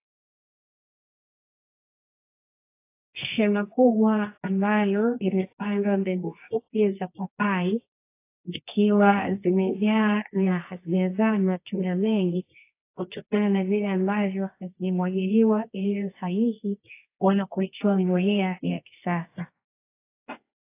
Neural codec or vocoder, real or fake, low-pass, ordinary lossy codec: codec, 24 kHz, 0.9 kbps, WavTokenizer, medium music audio release; fake; 3.6 kHz; AAC, 24 kbps